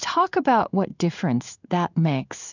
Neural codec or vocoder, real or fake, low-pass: codec, 16 kHz in and 24 kHz out, 1 kbps, XY-Tokenizer; fake; 7.2 kHz